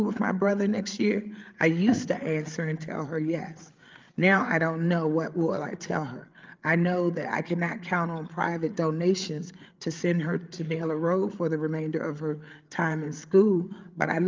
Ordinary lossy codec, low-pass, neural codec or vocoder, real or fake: Opus, 24 kbps; 7.2 kHz; codec, 16 kHz, 8 kbps, FreqCodec, larger model; fake